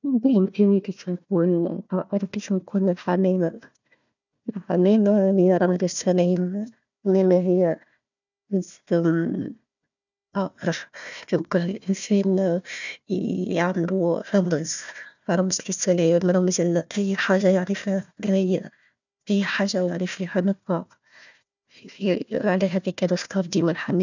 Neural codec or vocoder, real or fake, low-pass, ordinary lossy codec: codec, 16 kHz, 1 kbps, FunCodec, trained on Chinese and English, 50 frames a second; fake; 7.2 kHz; none